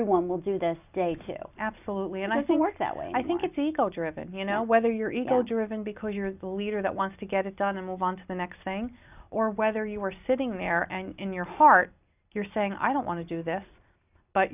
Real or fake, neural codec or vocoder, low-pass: real; none; 3.6 kHz